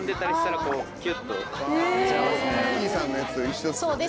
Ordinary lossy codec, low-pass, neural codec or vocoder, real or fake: none; none; none; real